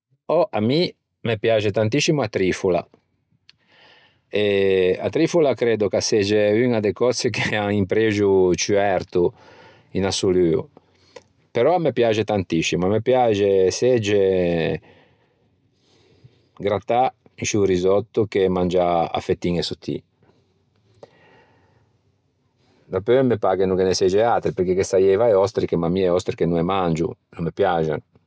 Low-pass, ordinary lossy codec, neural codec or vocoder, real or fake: none; none; none; real